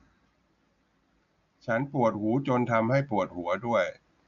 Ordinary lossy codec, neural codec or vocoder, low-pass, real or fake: none; none; 7.2 kHz; real